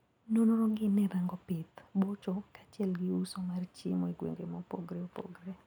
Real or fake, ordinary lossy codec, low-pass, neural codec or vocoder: real; none; 19.8 kHz; none